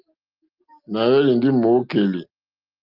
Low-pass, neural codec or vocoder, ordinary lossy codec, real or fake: 5.4 kHz; none; Opus, 32 kbps; real